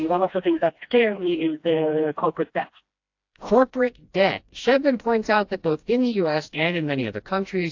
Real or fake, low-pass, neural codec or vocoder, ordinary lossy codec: fake; 7.2 kHz; codec, 16 kHz, 1 kbps, FreqCodec, smaller model; AAC, 48 kbps